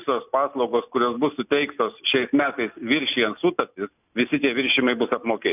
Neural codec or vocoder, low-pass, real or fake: none; 3.6 kHz; real